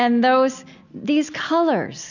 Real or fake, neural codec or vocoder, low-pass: real; none; 7.2 kHz